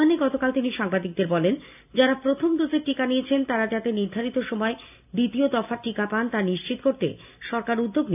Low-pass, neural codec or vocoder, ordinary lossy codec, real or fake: 3.6 kHz; none; none; real